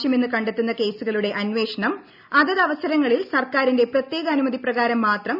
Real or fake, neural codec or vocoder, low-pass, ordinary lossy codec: real; none; 5.4 kHz; none